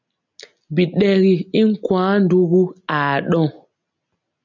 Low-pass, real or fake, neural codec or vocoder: 7.2 kHz; real; none